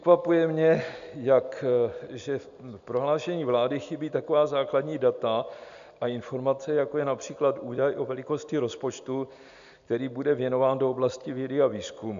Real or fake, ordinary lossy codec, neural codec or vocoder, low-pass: real; AAC, 96 kbps; none; 7.2 kHz